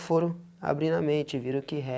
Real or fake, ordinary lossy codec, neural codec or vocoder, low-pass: real; none; none; none